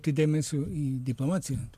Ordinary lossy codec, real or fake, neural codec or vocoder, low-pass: MP3, 96 kbps; real; none; 14.4 kHz